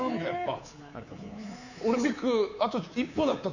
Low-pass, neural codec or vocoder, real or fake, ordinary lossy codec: 7.2 kHz; codec, 24 kHz, 3.1 kbps, DualCodec; fake; none